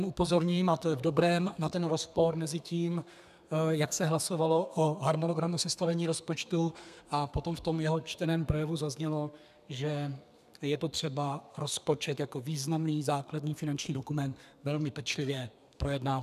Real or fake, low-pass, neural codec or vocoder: fake; 14.4 kHz; codec, 32 kHz, 1.9 kbps, SNAC